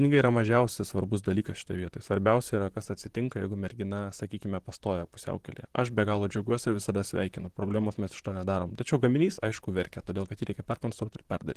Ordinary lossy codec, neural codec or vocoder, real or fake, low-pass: Opus, 16 kbps; vocoder, 44.1 kHz, 128 mel bands, Pupu-Vocoder; fake; 14.4 kHz